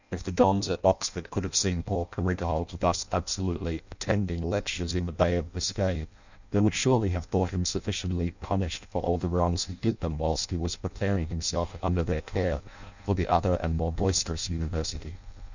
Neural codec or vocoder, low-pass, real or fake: codec, 16 kHz in and 24 kHz out, 0.6 kbps, FireRedTTS-2 codec; 7.2 kHz; fake